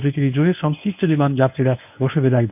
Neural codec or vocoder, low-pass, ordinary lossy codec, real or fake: codec, 24 kHz, 0.9 kbps, WavTokenizer, medium speech release version 1; 3.6 kHz; none; fake